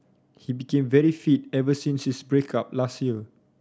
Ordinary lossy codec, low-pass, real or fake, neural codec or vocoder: none; none; real; none